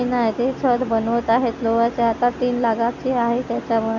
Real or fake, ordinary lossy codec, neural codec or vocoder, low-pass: real; none; none; 7.2 kHz